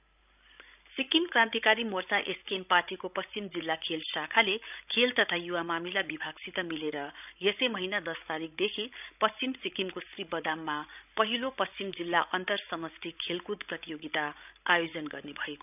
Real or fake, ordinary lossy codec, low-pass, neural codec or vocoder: fake; none; 3.6 kHz; codec, 16 kHz, 16 kbps, FreqCodec, larger model